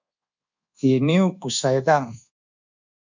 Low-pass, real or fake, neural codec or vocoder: 7.2 kHz; fake; codec, 24 kHz, 1.2 kbps, DualCodec